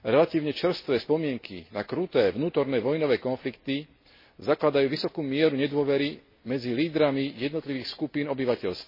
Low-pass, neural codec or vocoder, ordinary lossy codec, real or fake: 5.4 kHz; none; MP3, 24 kbps; real